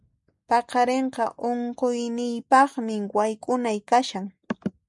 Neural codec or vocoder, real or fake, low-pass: none; real; 10.8 kHz